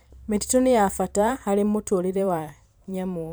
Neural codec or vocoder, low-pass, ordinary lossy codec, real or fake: vocoder, 44.1 kHz, 128 mel bands every 512 samples, BigVGAN v2; none; none; fake